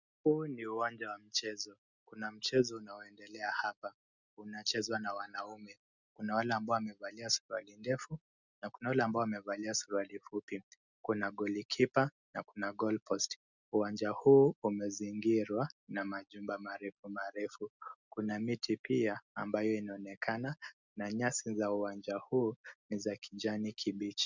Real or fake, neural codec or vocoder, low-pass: real; none; 7.2 kHz